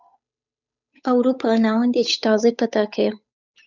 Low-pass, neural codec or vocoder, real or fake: 7.2 kHz; codec, 16 kHz, 8 kbps, FunCodec, trained on Chinese and English, 25 frames a second; fake